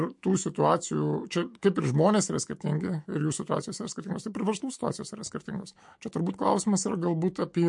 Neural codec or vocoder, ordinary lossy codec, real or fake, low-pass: autoencoder, 48 kHz, 128 numbers a frame, DAC-VAE, trained on Japanese speech; MP3, 48 kbps; fake; 10.8 kHz